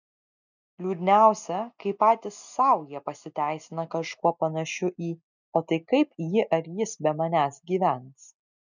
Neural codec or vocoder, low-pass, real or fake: none; 7.2 kHz; real